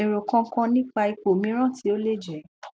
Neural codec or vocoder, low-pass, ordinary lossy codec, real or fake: none; none; none; real